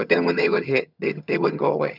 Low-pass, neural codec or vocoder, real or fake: 5.4 kHz; vocoder, 22.05 kHz, 80 mel bands, HiFi-GAN; fake